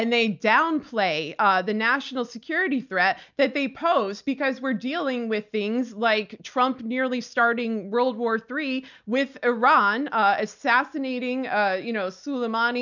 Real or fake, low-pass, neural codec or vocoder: real; 7.2 kHz; none